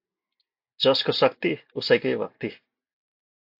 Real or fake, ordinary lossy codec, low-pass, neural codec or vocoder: real; AAC, 48 kbps; 5.4 kHz; none